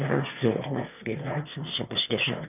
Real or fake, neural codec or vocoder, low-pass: fake; autoencoder, 22.05 kHz, a latent of 192 numbers a frame, VITS, trained on one speaker; 3.6 kHz